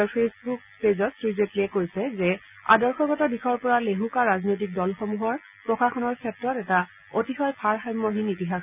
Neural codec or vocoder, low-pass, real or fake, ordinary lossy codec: none; 3.6 kHz; real; none